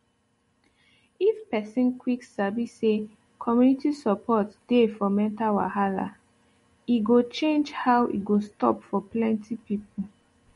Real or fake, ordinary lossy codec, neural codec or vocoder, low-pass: real; MP3, 48 kbps; none; 19.8 kHz